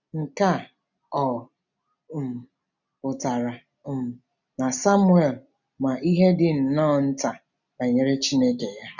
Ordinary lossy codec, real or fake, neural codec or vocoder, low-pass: none; real; none; 7.2 kHz